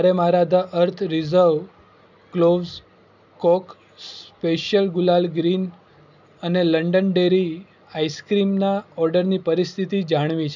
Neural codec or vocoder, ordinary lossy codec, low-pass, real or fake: none; none; 7.2 kHz; real